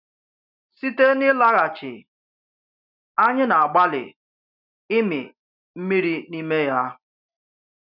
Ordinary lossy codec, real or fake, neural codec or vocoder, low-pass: none; real; none; 5.4 kHz